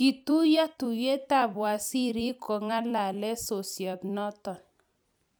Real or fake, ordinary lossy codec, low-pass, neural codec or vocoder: fake; none; none; vocoder, 44.1 kHz, 128 mel bands every 256 samples, BigVGAN v2